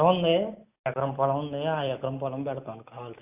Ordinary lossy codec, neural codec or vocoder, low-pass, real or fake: AAC, 32 kbps; none; 3.6 kHz; real